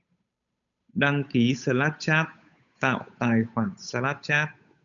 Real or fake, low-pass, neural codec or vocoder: fake; 7.2 kHz; codec, 16 kHz, 8 kbps, FunCodec, trained on Chinese and English, 25 frames a second